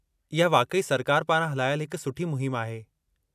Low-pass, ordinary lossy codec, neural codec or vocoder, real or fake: 14.4 kHz; none; none; real